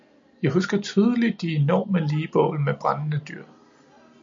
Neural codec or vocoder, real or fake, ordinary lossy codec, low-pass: none; real; MP3, 48 kbps; 7.2 kHz